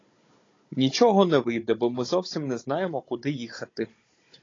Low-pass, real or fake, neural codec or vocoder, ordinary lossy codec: 7.2 kHz; fake; codec, 16 kHz, 16 kbps, FunCodec, trained on Chinese and English, 50 frames a second; AAC, 32 kbps